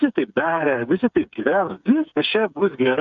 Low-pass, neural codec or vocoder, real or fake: 7.2 kHz; codec, 16 kHz, 8 kbps, FreqCodec, smaller model; fake